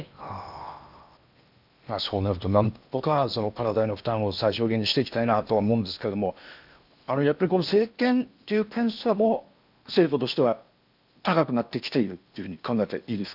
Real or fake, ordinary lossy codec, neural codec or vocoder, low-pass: fake; none; codec, 16 kHz in and 24 kHz out, 0.8 kbps, FocalCodec, streaming, 65536 codes; 5.4 kHz